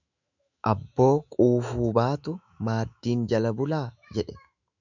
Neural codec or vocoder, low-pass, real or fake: autoencoder, 48 kHz, 128 numbers a frame, DAC-VAE, trained on Japanese speech; 7.2 kHz; fake